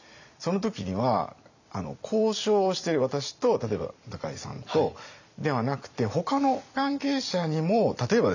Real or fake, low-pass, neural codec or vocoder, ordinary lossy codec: fake; 7.2 kHz; vocoder, 44.1 kHz, 128 mel bands every 256 samples, BigVGAN v2; none